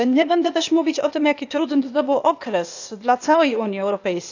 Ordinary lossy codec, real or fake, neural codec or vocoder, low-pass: none; fake; codec, 16 kHz, 0.8 kbps, ZipCodec; 7.2 kHz